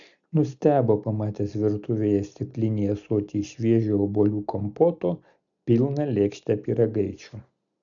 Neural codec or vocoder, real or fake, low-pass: none; real; 7.2 kHz